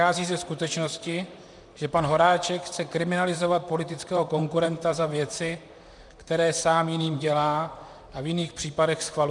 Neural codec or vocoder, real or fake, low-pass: vocoder, 44.1 kHz, 128 mel bands, Pupu-Vocoder; fake; 10.8 kHz